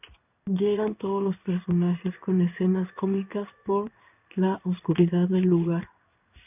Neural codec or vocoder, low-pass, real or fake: codec, 16 kHz in and 24 kHz out, 1 kbps, XY-Tokenizer; 3.6 kHz; fake